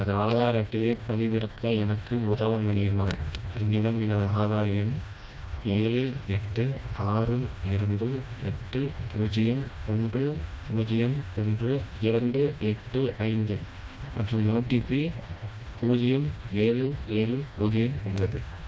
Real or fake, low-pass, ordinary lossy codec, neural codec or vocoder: fake; none; none; codec, 16 kHz, 1 kbps, FreqCodec, smaller model